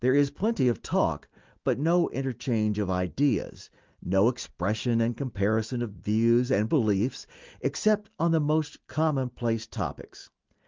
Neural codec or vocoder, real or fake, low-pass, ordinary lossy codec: none; real; 7.2 kHz; Opus, 32 kbps